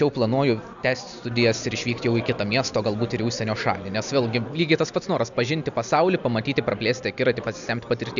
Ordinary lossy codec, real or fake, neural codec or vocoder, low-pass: MP3, 96 kbps; real; none; 7.2 kHz